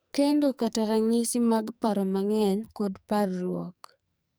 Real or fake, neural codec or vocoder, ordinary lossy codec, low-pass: fake; codec, 44.1 kHz, 2.6 kbps, SNAC; none; none